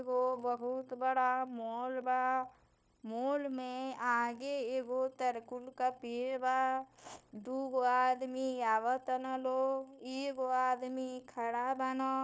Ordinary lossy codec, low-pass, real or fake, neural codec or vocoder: none; none; fake; codec, 16 kHz, 0.9 kbps, LongCat-Audio-Codec